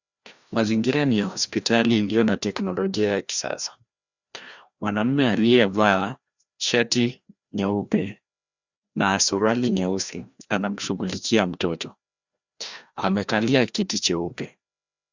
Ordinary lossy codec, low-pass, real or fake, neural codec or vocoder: Opus, 64 kbps; 7.2 kHz; fake; codec, 16 kHz, 1 kbps, FreqCodec, larger model